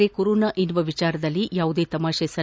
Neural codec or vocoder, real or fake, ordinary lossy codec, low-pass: none; real; none; none